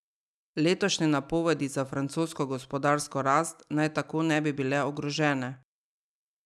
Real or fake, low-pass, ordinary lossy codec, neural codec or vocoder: real; none; none; none